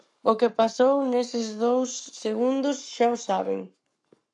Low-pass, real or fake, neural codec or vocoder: 10.8 kHz; fake; codec, 44.1 kHz, 7.8 kbps, Pupu-Codec